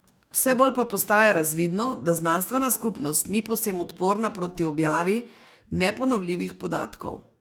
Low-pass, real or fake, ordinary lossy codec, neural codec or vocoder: none; fake; none; codec, 44.1 kHz, 2.6 kbps, DAC